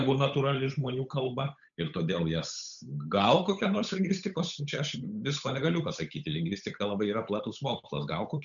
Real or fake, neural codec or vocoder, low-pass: fake; codec, 16 kHz, 8 kbps, FunCodec, trained on Chinese and English, 25 frames a second; 7.2 kHz